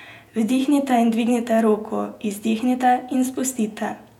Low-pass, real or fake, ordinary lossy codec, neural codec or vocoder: 19.8 kHz; fake; none; vocoder, 48 kHz, 128 mel bands, Vocos